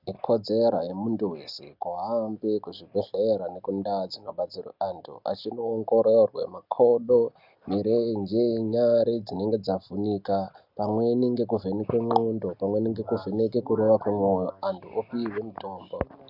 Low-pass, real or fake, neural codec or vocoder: 5.4 kHz; real; none